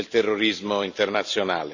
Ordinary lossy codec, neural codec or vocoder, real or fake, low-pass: none; vocoder, 44.1 kHz, 128 mel bands every 256 samples, BigVGAN v2; fake; 7.2 kHz